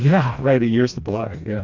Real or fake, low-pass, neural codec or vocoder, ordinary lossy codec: fake; 7.2 kHz; codec, 16 kHz, 2 kbps, FreqCodec, smaller model; Opus, 64 kbps